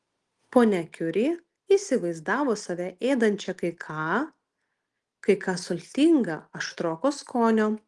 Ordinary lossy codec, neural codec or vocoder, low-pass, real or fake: Opus, 24 kbps; none; 10.8 kHz; real